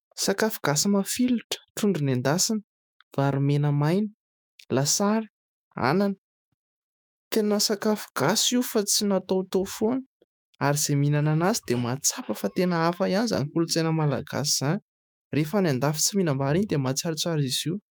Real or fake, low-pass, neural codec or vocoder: fake; 19.8 kHz; autoencoder, 48 kHz, 128 numbers a frame, DAC-VAE, trained on Japanese speech